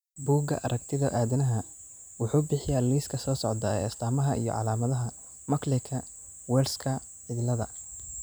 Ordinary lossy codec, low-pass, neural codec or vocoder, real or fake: none; none; vocoder, 44.1 kHz, 128 mel bands every 256 samples, BigVGAN v2; fake